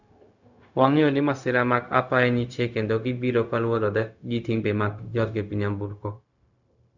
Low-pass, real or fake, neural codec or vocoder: 7.2 kHz; fake; codec, 16 kHz, 0.4 kbps, LongCat-Audio-Codec